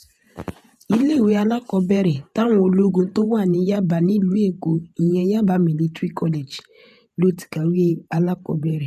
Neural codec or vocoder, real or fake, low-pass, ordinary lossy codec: vocoder, 48 kHz, 128 mel bands, Vocos; fake; 14.4 kHz; none